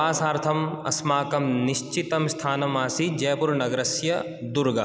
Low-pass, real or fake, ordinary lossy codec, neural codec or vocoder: none; real; none; none